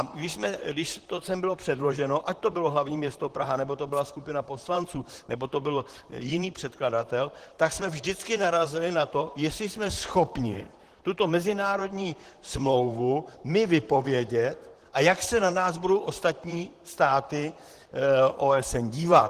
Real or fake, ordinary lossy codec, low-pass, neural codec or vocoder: fake; Opus, 16 kbps; 14.4 kHz; vocoder, 44.1 kHz, 128 mel bands, Pupu-Vocoder